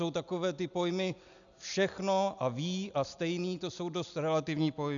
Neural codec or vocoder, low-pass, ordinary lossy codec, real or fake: none; 7.2 kHz; MP3, 96 kbps; real